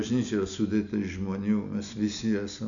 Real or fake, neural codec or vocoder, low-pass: real; none; 7.2 kHz